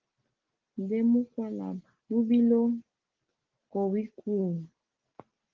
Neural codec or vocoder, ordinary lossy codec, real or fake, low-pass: none; Opus, 16 kbps; real; 7.2 kHz